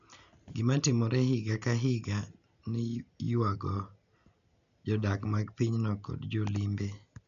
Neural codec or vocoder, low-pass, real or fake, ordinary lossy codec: none; 7.2 kHz; real; none